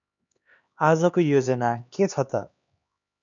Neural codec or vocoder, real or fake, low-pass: codec, 16 kHz, 2 kbps, X-Codec, HuBERT features, trained on LibriSpeech; fake; 7.2 kHz